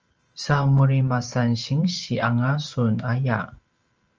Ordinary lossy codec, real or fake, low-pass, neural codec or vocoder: Opus, 24 kbps; fake; 7.2 kHz; vocoder, 44.1 kHz, 128 mel bands every 512 samples, BigVGAN v2